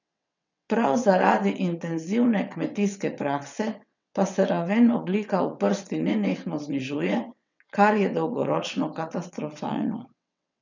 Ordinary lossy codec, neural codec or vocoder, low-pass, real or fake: none; vocoder, 22.05 kHz, 80 mel bands, WaveNeXt; 7.2 kHz; fake